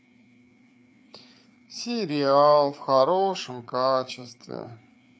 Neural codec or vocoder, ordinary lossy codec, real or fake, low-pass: codec, 16 kHz, 4 kbps, FreqCodec, larger model; none; fake; none